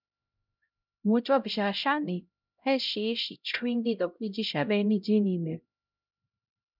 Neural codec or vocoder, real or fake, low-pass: codec, 16 kHz, 0.5 kbps, X-Codec, HuBERT features, trained on LibriSpeech; fake; 5.4 kHz